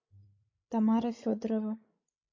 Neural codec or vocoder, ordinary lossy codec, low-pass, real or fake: codec, 16 kHz, 16 kbps, FreqCodec, larger model; MP3, 32 kbps; 7.2 kHz; fake